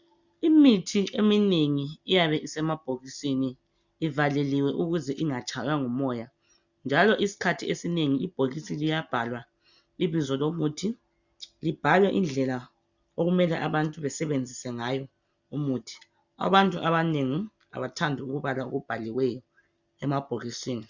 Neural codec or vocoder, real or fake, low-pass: none; real; 7.2 kHz